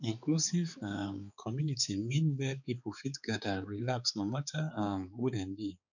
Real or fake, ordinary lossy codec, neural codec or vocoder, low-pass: fake; none; codec, 16 kHz, 4 kbps, X-Codec, HuBERT features, trained on balanced general audio; 7.2 kHz